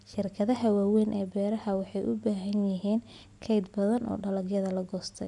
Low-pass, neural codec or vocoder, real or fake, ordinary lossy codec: 10.8 kHz; none; real; none